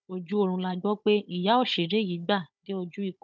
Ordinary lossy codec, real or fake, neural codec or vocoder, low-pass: none; fake; codec, 16 kHz, 16 kbps, FunCodec, trained on Chinese and English, 50 frames a second; none